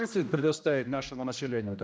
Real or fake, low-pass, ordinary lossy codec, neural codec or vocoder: fake; none; none; codec, 16 kHz, 1 kbps, X-Codec, HuBERT features, trained on general audio